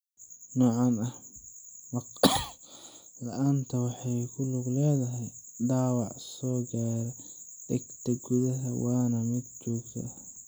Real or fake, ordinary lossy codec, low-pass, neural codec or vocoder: real; none; none; none